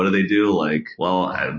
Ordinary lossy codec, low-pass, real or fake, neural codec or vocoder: MP3, 32 kbps; 7.2 kHz; real; none